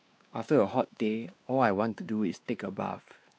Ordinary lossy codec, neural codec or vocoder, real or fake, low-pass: none; codec, 16 kHz, 2 kbps, X-Codec, WavLM features, trained on Multilingual LibriSpeech; fake; none